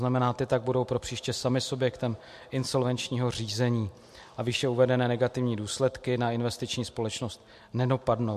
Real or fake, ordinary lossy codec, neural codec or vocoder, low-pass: real; MP3, 64 kbps; none; 14.4 kHz